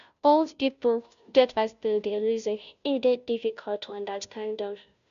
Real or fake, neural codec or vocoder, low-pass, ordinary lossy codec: fake; codec, 16 kHz, 0.5 kbps, FunCodec, trained on Chinese and English, 25 frames a second; 7.2 kHz; none